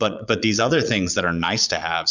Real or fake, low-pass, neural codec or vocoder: fake; 7.2 kHz; vocoder, 22.05 kHz, 80 mel bands, Vocos